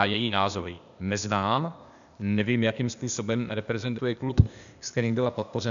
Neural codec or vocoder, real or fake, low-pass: codec, 16 kHz, 0.8 kbps, ZipCodec; fake; 7.2 kHz